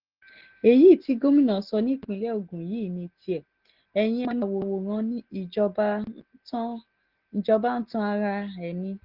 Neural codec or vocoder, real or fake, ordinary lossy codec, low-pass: none; real; Opus, 16 kbps; 5.4 kHz